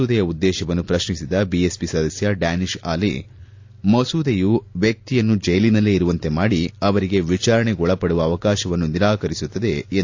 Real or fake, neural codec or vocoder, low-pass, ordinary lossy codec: real; none; 7.2 kHz; AAC, 48 kbps